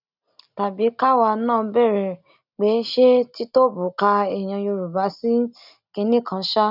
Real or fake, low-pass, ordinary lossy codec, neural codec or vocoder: real; 5.4 kHz; none; none